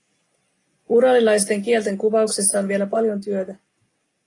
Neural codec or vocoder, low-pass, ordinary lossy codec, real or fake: none; 10.8 kHz; AAC, 32 kbps; real